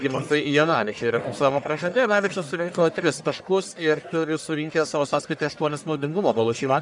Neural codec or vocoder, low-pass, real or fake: codec, 44.1 kHz, 1.7 kbps, Pupu-Codec; 10.8 kHz; fake